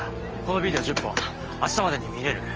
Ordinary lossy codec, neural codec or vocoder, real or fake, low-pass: Opus, 24 kbps; none; real; 7.2 kHz